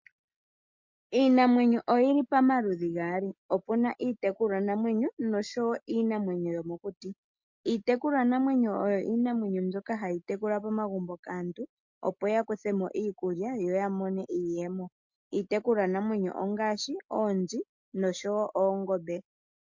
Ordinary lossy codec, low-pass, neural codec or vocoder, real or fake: MP3, 48 kbps; 7.2 kHz; none; real